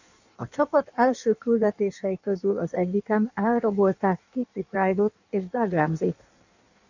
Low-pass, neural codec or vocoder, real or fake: 7.2 kHz; codec, 16 kHz in and 24 kHz out, 1.1 kbps, FireRedTTS-2 codec; fake